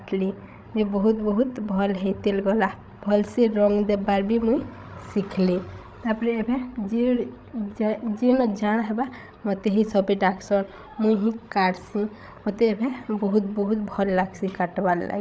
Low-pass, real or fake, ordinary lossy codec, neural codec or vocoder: none; fake; none; codec, 16 kHz, 16 kbps, FreqCodec, larger model